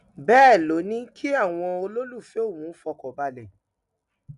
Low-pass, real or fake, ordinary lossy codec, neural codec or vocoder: 10.8 kHz; real; none; none